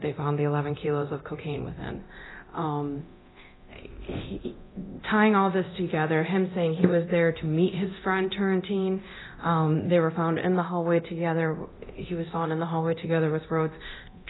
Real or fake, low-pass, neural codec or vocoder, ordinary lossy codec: fake; 7.2 kHz; codec, 24 kHz, 0.9 kbps, DualCodec; AAC, 16 kbps